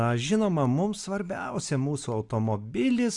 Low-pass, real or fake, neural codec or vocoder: 10.8 kHz; real; none